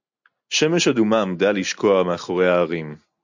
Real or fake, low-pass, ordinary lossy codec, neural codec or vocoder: real; 7.2 kHz; MP3, 64 kbps; none